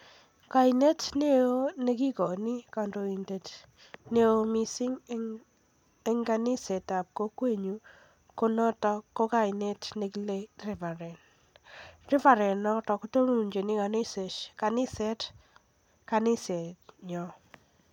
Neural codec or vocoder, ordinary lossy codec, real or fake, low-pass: none; none; real; 19.8 kHz